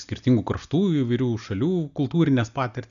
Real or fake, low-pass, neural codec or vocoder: real; 7.2 kHz; none